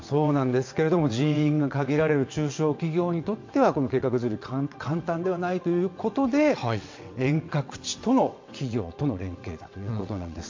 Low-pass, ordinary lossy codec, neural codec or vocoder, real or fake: 7.2 kHz; MP3, 48 kbps; vocoder, 22.05 kHz, 80 mel bands, WaveNeXt; fake